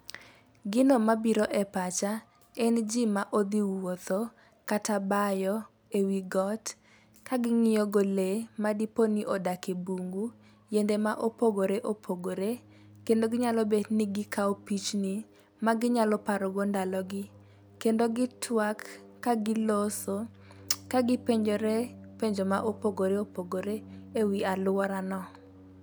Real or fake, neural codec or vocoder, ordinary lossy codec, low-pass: real; none; none; none